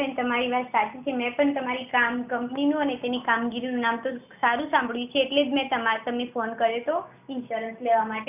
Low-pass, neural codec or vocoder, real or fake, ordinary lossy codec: 3.6 kHz; none; real; none